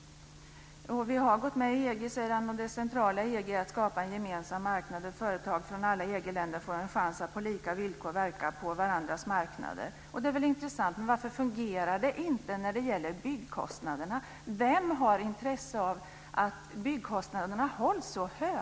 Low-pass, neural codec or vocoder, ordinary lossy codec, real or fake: none; none; none; real